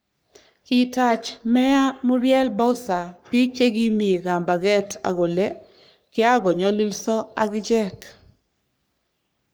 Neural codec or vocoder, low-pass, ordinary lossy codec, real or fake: codec, 44.1 kHz, 3.4 kbps, Pupu-Codec; none; none; fake